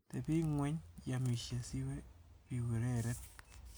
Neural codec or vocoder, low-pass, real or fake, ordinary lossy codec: none; none; real; none